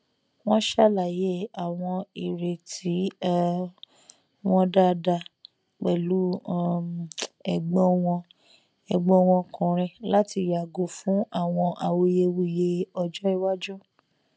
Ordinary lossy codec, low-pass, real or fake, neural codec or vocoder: none; none; real; none